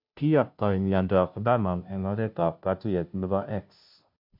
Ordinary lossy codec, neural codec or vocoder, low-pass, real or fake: MP3, 48 kbps; codec, 16 kHz, 0.5 kbps, FunCodec, trained on Chinese and English, 25 frames a second; 5.4 kHz; fake